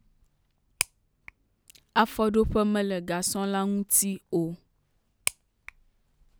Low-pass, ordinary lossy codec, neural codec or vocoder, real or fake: none; none; none; real